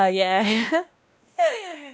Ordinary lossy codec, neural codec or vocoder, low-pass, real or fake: none; codec, 16 kHz, 1 kbps, X-Codec, WavLM features, trained on Multilingual LibriSpeech; none; fake